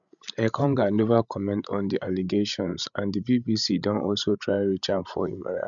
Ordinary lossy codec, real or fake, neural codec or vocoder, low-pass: none; fake; codec, 16 kHz, 16 kbps, FreqCodec, larger model; 7.2 kHz